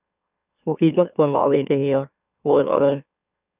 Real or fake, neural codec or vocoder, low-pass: fake; autoencoder, 44.1 kHz, a latent of 192 numbers a frame, MeloTTS; 3.6 kHz